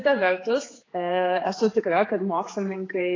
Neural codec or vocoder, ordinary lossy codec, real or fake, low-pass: codec, 16 kHz, 4 kbps, X-Codec, HuBERT features, trained on general audio; AAC, 32 kbps; fake; 7.2 kHz